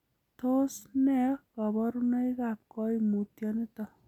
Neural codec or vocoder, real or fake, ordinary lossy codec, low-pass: none; real; none; 19.8 kHz